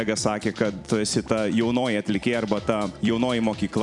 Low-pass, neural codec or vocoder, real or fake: 10.8 kHz; vocoder, 44.1 kHz, 128 mel bands every 256 samples, BigVGAN v2; fake